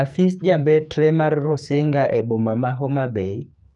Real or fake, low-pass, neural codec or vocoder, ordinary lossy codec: fake; 10.8 kHz; codec, 44.1 kHz, 2.6 kbps, SNAC; none